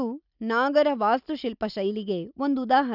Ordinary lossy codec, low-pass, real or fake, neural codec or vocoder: none; 5.4 kHz; real; none